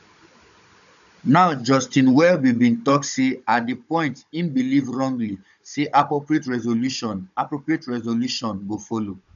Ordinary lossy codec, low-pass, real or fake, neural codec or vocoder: none; 7.2 kHz; fake; codec, 16 kHz, 16 kbps, FunCodec, trained on Chinese and English, 50 frames a second